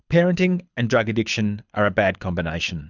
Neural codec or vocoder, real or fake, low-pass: codec, 24 kHz, 6 kbps, HILCodec; fake; 7.2 kHz